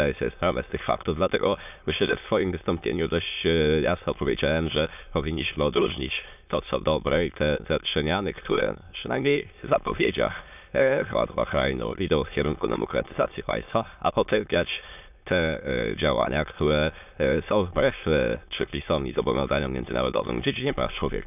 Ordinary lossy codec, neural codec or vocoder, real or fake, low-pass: none; autoencoder, 22.05 kHz, a latent of 192 numbers a frame, VITS, trained on many speakers; fake; 3.6 kHz